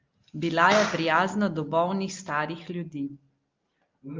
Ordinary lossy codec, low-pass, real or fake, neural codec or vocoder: Opus, 24 kbps; 7.2 kHz; real; none